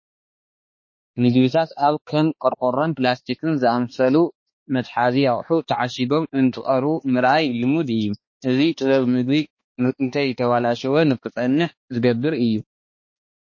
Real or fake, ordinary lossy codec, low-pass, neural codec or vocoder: fake; MP3, 32 kbps; 7.2 kHz; codec, 16 kHz, 2 kbps, X-Codec, HuBERT features, trained on balanced general audio